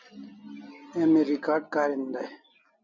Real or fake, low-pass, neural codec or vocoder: real; 7.2 kHz; none